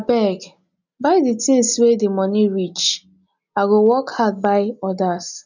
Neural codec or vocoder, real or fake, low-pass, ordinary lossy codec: none; real; 7.2 kHz; none